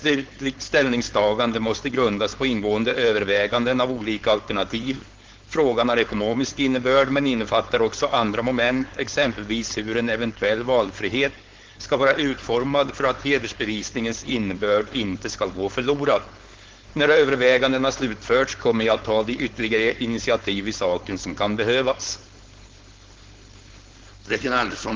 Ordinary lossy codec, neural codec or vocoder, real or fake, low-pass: Opus, 16 kbps; codec, 16 kHz, 4.8 kbps, FACodec; fake; 7.2 kHz